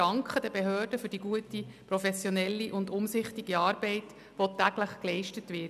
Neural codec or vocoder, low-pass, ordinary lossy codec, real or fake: none; 14.4 kHz; none; real